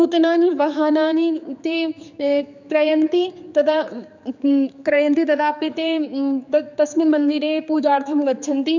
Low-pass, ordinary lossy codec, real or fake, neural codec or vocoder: 7.2 kHz; none; fake; codec, 16 kHz, 4 kbps, X-Codec, HuBERT features, trained on general audio